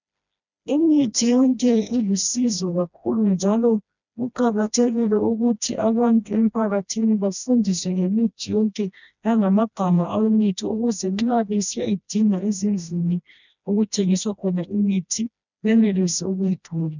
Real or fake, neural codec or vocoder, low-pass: fake; codec, 16 kHz, 1 kbps, FreqCodec, smaller model; 7.2 kHz